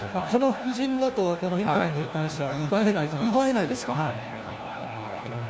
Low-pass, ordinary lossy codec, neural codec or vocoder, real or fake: none; none; codec, 16 kHz, 1 kbps, FunCodec, trained on LibriTTS, 50 frames a second; fake